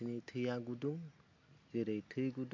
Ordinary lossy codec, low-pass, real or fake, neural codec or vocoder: MP3, 48 kbps; 7.2 kHz; real; none